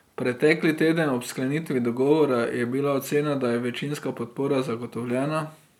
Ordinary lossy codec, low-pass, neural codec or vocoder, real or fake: none; 19.8 kHz; none; real